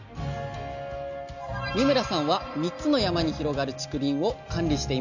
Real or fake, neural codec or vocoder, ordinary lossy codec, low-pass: real; none; none; 7.2 kHz